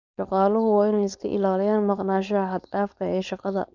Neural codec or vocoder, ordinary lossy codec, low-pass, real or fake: codec, 16 kHz, 4.8 kbps, FACodec; none; 7.2 kHz; fake